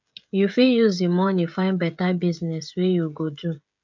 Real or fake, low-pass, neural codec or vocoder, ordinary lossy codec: fake; 7.2 kHz; codec, 16 kHz, 16 kbps, FreqCodec, smaller model; none